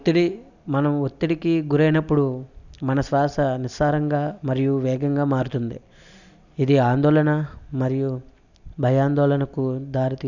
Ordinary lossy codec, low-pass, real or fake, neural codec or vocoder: none; 7.2 kHz; real; none